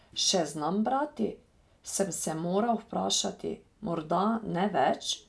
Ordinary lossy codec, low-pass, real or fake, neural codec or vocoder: none; none; real; none